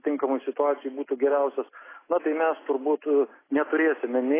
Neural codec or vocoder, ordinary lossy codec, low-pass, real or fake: none; AAC, 16 kbps; 3.6 kHz; real